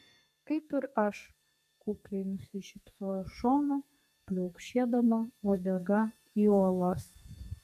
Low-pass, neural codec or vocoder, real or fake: 14.4 kHz; codec, 32 kHz, 1.9 kbps, SNAC; fake